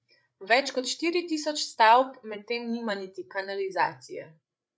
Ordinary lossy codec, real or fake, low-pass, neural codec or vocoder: none; fake; none; codec, 16 kHz, 8 kbps, FreqCodec, larger model